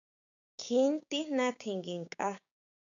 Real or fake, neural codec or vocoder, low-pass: fake; codec, 16 kHz, 6 kbps, DAC; 7.2 kHz